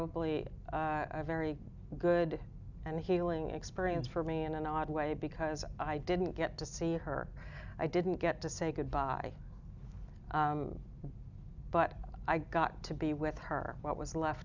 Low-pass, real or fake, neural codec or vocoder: 7.2 kHz; real; none